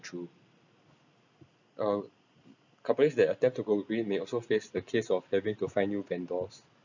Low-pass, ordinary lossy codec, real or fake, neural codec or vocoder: 7.2 kHz; none; real; none